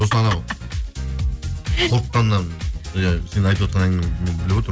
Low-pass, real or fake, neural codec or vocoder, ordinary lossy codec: none; real; none; none